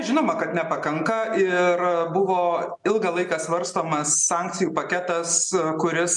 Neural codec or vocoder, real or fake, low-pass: none; real; 10.8 kHz